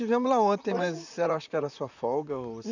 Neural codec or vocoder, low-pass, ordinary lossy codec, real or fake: vocoder, 44.1 kHz, 128 mel bands, Pupu-Vocoder; 7.2 kHz; none; fake